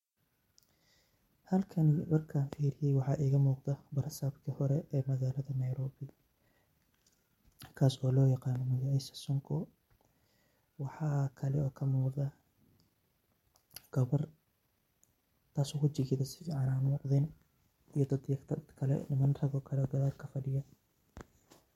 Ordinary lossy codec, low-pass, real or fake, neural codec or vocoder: MP3, 64 kbps; 19.8 kHz; real; none